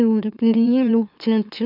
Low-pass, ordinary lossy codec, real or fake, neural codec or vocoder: 5.4 kHz; none; fake; autoencoder, 44.1 kHz, a latent of 192 numbers a frame, MeloTTS